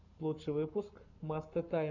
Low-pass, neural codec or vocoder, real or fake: 7.2 kHz; codec, 44.1 kHz, 7.8 kbps, Pupu-Codec; fake